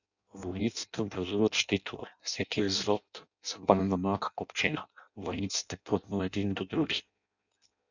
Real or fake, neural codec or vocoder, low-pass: fake; codec, 16 kHz in and 24 kHz out, 0.6 kbps, FireRedTTS-2 codec; 7.2 kHz